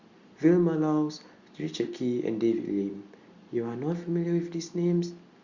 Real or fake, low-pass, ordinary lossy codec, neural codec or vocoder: real; 7.2 kHz; Opus, 64 kbps; none